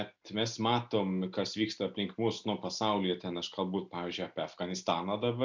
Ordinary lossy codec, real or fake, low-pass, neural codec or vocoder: MP3, 64 kbps; real; 7.2 kHz; none